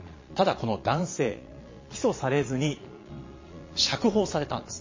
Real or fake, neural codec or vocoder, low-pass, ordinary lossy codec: fake; vocoder, 22.05 kHz, 80 mel bands, Vocos; 7.2 kHz; MP3, 32 kbps